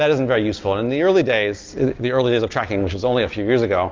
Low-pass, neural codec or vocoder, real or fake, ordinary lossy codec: 7.2 kHz; none; real; Opus, 32 kbps